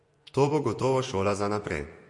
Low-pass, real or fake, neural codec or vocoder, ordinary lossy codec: 10.8 kHz; fake; vocoder, 24 kHz, 100 mel bands, Vocos; MP3, 48 kbps